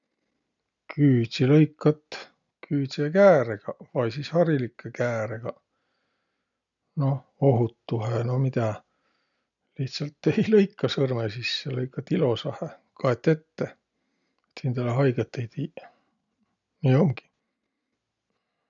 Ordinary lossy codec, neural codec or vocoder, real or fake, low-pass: none; none; real; 7.2 kHz